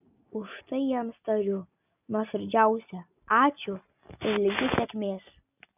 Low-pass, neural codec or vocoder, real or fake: 3.6 kHz; none; real